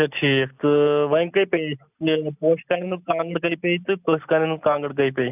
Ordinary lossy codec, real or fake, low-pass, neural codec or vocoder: none; real; 3.6 kHz; none